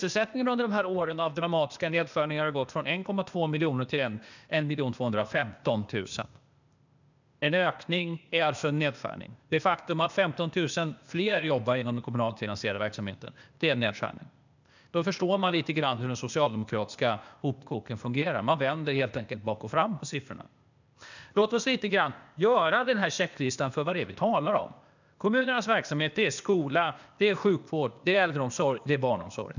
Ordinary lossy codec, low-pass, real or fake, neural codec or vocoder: none; 7.2 kHz; fake; codec, 16 kHz, 0.8 kbps, ZipCodec